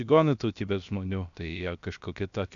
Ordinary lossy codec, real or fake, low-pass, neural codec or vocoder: MP3, 96 kbps; fake; 7.2 kHz; codec, 16 kHz, 0.7 kbps, FocalCodec